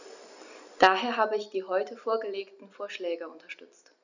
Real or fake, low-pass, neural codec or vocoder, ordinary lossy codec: real; 7.2 kHz; none; none